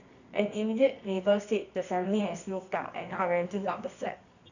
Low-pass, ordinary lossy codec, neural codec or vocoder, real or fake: 7.2 kHz; none; codec, 24 kHz, 0.9 kbps, WavTokenizer, medium music audio release; fake